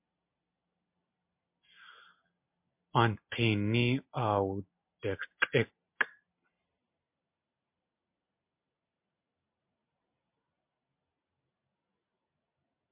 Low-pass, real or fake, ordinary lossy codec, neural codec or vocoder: 3.6 kHz; real; MP3, 32 kbps; none